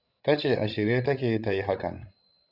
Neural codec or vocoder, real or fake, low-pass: codec, 16 kHz, 16 kbps, FreqCodec, larger model; fake; 5.4 kHz